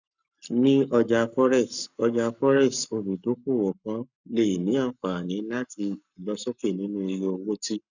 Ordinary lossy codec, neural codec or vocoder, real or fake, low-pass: none; codec, 44.1 kHz, 7.8 kbps, Pupu-Codec; fake; 7.2 kHz